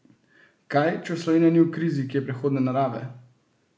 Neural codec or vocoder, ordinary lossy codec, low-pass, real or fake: none; none; none; real